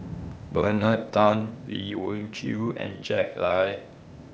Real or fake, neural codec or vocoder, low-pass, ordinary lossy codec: fake; codec, 16 kHz, 0.8 kbps, ZipCodec; none; none